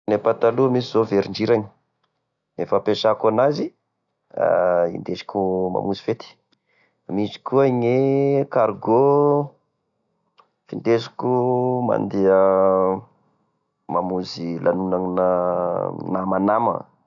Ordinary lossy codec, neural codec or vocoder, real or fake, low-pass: none; none; real; 7.2 kHz